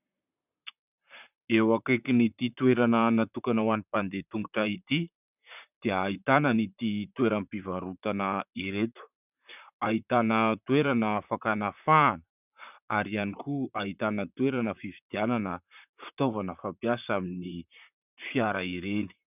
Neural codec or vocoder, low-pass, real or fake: vocoder, 44.1 kHz, 128 mel bands, Pupu-Vocoder; 3.6 kHz; fake